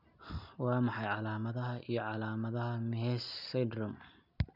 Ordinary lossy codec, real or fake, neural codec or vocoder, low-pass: none; real; none; 5.4 kHz